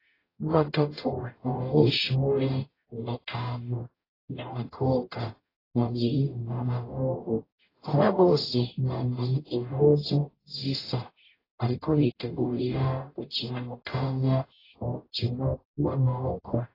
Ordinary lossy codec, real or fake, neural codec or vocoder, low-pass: AAC, 24 kbps; fake; codec, 44.1 kHz, 0.9 kbps, DAC; 5.4 kHz